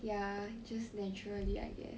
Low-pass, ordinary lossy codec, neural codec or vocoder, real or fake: none; none; none; real